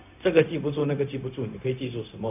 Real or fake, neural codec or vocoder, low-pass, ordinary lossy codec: fake; codec, 16 kHz, 0.4 kbps, LongCat-Audio-Codec; 3.6 kHz; none